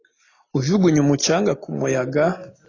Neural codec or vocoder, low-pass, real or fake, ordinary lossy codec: none; 7.2 kHz; real; AAC, 32 kbps